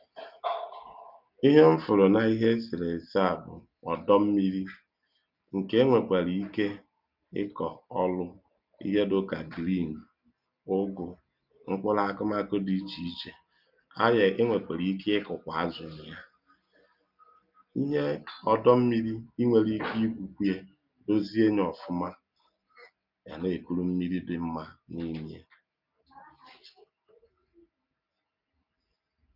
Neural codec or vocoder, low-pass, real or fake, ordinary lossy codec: none; 5.4 kHz; real; none